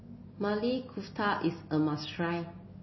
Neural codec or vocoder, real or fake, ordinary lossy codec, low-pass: none; real; MP3, 24 kbps; 7.2 kHz